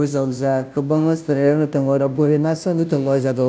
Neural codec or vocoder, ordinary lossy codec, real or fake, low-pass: codec, 16 kHz, 0.5 kbps, FunCodec, trained on Chinese and English, 25 frames a second; none; fake; none